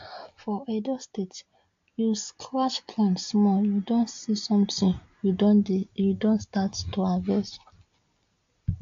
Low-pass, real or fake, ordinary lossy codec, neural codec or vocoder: 7.2 kHz; real; AAC, 48 kbps; none